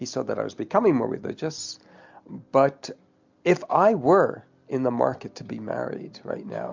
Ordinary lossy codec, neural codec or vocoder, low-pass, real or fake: MP3, 64 kbps; none; 7.2 kHz; real